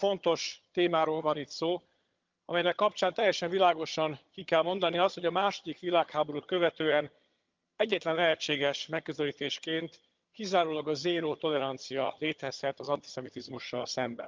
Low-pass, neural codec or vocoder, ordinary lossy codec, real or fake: 7.2 kHz; vocoder, 22.05 kHz, 80 mel bands, HiFi-GAN; Opus, 24 kbps; fake